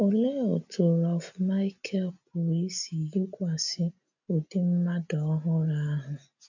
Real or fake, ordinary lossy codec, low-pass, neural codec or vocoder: real; none; 7.2 kHz; none